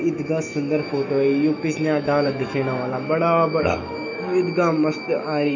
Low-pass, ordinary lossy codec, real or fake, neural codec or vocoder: 7.2 kHz; none; fake; autoencoder, 48 kHz, 128 numbers a frame, DAC-VAE, trained on Japanese speech